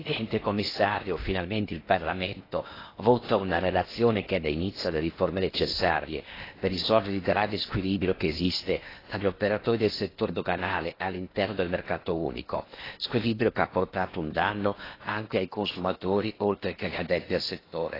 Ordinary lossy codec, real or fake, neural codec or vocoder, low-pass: AAC, 24 kbps; fake; codec, 16 kHz in and 24 kHz out, 0.8 kbps, FocalCodec, streaming, 65536 codes; 5.4 kHz